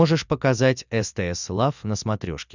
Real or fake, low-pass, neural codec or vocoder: real; 7.2 kHz; none